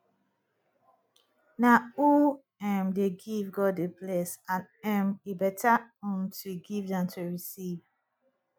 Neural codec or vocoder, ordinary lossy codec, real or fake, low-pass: none; none; real; none